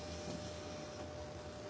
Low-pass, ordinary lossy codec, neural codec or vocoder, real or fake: none; none; none; real